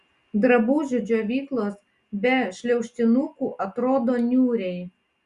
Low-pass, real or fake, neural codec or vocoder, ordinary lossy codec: 10.8 kHz; real; none; Opus, 64 kbps